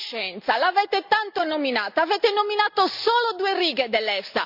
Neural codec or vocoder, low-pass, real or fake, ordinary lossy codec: none; 5.4 kHz; real; none